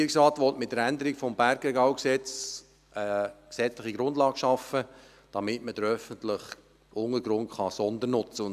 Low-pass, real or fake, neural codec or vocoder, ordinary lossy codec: 14.4 kHz; real; none; none